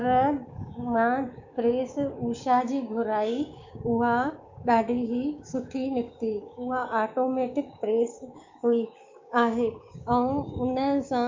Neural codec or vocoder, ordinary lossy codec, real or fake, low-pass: codec, 16 kHz, 6 kbps, DAC; MP3, 48 kbps; fake; 7.2 kHz